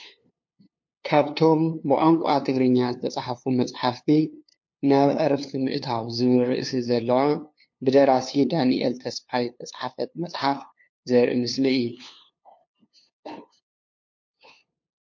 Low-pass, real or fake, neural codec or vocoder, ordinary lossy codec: 7.2 kHz; fake; codec, 16 kHz, 2 kbps, FunCodec, trained on LibriTTS, 25 frames a second; MP3, 48 kbps